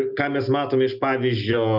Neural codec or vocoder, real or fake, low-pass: none; real; 5.4 kHz